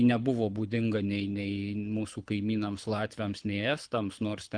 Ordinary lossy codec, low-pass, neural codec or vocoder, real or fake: Opus, 24 kbps; 9.9 kHz; codec, 24 kHz, 6 kbps, HILCodec; fake